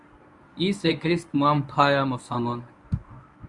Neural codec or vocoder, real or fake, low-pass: codec, 24 kHz, 0.9 kbps, WavTokenizer, medium speech release version 1; fake; 10.8 kHz